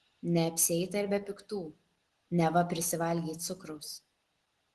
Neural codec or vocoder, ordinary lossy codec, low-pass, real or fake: none; Opus, 16 kbps; 10.8 kHz; real